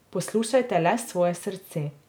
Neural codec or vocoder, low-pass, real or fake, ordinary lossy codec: none; none; real; none